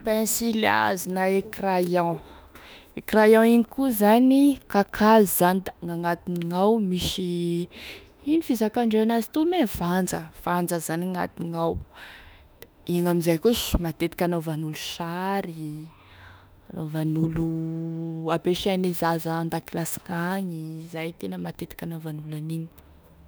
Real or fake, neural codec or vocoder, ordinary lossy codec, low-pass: fake; autoencoder, 48 kHz, 32 numbers a frame, DAC-VAE, trained on Japanese speech; none; none